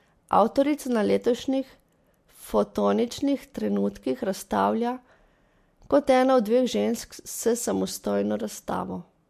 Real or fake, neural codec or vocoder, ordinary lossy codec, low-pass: real; none; MP3, 64 kbps; 14.4 kHz